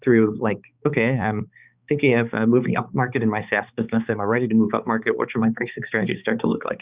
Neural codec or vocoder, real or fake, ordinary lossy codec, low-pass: codec, 16 kHz, 4 kbps, X-Codec, HuBERT features, trained on balanced general audio; fake; Opus, 64 kbps; 3.6 kHz